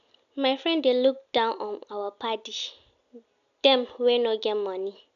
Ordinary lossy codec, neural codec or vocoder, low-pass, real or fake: none; none; 7.2 kHz; real